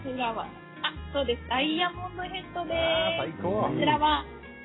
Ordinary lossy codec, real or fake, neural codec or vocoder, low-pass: AAC, 16 kbps; real; none; 7.2 kHz